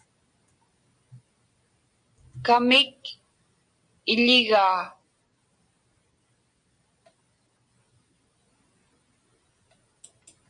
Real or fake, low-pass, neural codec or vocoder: real; 9.9 kHz; none